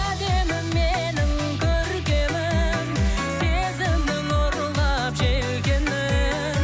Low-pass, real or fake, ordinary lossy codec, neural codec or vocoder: none; real; none; none